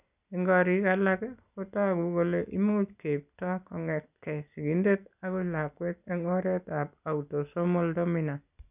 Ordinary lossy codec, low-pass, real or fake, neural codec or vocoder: none; 3.6 kHz; real; none